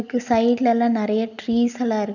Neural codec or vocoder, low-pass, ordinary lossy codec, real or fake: none; 7.2 kHz; none; real